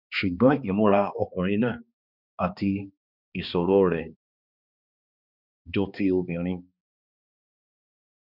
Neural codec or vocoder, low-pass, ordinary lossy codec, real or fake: codec, 16 kHz, 2 kbps, X-Codec, HuBERT features, trained on balanced general audio; 5.4 kHz; none; fake